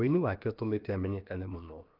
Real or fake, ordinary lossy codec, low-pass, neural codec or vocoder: fake; Opus, 24 kbps; 5.4 kHz; codec, 16 kHz, about 1 kbps, DyCAST, with the encoder's durations